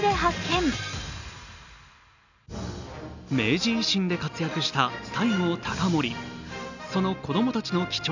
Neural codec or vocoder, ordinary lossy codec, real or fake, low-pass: none; none; real; 7.2 kHz